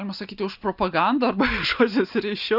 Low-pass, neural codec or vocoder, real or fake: 5.4 kHz; none; real